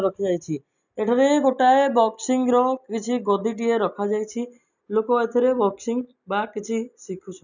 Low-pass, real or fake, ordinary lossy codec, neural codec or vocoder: 7.2 kHz; real; none; none